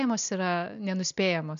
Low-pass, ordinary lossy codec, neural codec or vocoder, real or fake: 7.2 kHz; MP3, 96 kbps; none; real